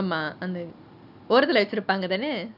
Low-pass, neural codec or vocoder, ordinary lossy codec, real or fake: 5.4 kHz; none; none; real